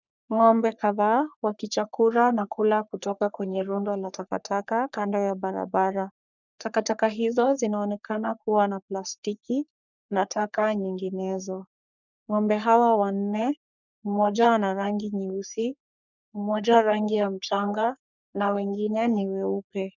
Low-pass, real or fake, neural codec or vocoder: 7.2 kHz; fake; codec, 44.1 kHz, 3.4 kbps, Pupu-Codec